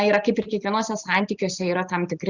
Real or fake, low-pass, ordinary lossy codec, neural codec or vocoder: real; 7.2 kHz; Opus, 64 kbps; none